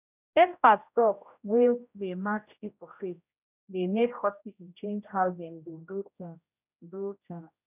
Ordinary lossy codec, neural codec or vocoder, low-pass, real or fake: none; codec, 16 kHz, 0.5 kbps, X-Codec, HuBERT features, trained on general audio; 3.6 kHz; fake